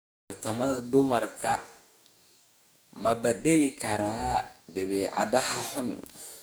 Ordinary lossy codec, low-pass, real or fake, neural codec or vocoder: none; none; fake; codec, 44.1 kHz, 2.6 kbps, DAC